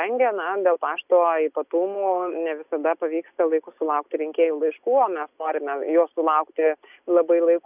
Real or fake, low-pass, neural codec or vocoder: real; 3.6 kHz; none